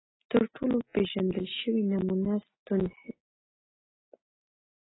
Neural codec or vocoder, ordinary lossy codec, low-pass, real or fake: none; AAC, 16 kbps; 7.2 kHz; real